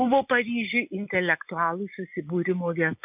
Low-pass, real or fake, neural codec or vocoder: 3.6 kHz; real; none